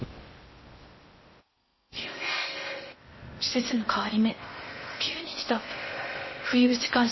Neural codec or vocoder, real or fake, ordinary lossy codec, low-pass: codec, 16 kHz in and 24 kHz out, 0.6 kbps, FocalCodec, streaming, 4096 codes; fake; MP3, 24 kbps; 7.2 kHz